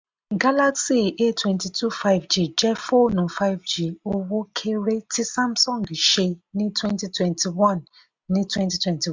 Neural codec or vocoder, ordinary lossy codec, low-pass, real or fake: none; MP3, 64 kbps; 7.2 kHz; real